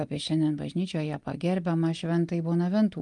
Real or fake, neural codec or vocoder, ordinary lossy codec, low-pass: real; none; Opus, 32 kbps; 10.8 kHz